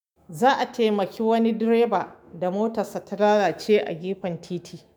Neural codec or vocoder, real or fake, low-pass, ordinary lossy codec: autoencoder, 48 kHz, 128 numbers a frame, DAC-VAE, trained on Japanese speech; fake; none; none